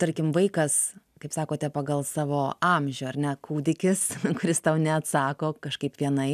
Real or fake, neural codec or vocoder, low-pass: real; none; 14.4 kHz